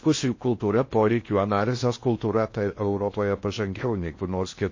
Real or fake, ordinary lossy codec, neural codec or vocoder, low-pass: fake; MP3, 32 kbps; codec, 16 kHz in and 24 kHz out, 0.6 kbps, FocalCodec, streaming, 4096 codes; 7.2 kHz